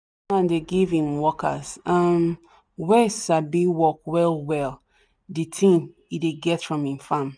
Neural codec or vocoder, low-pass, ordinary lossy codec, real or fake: none; 9.9 kHz; none; real